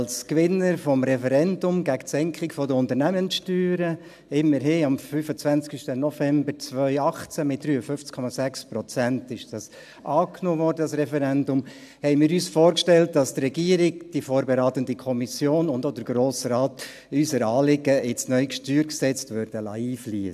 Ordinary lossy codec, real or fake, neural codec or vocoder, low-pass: AAC, 96 kbps; real; none; 14.4 kHz